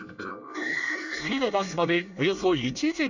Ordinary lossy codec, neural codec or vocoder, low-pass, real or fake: none; codec, 24 kHz, 1 kbps, SNAC; 7.2 kHz; fake